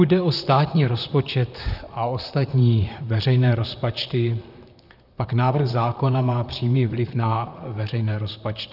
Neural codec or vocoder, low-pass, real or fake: vocoder, 44.1 kHz, 128 mel bands, Pupu-Vocoder; 5.4 kHz; fake